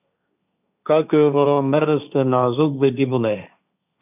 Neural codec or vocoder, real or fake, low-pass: codec, 16 kHz, 1.1 kbps, Voila-Tokenizer; fake; 3.6 kHz